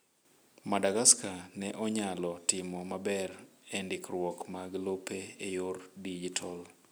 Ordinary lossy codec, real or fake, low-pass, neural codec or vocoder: none; real; none; none